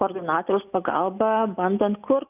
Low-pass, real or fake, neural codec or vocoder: 3.6 kHz; real; none